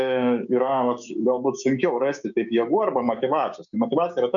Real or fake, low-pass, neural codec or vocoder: fake; 7.2 kHz; codec, 16 kHz, 6 kbps, DAC